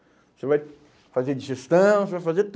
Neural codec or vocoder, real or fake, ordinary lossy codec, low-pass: none; real; none; none